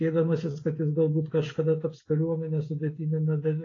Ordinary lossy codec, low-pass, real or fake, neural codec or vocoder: AAC, 32 kbps; 7.2 kHz; fake; codec, 16 kHz, 16 kbps, FreqCodec, smaller model